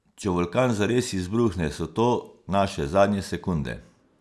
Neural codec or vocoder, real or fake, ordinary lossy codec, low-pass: none; real; none; none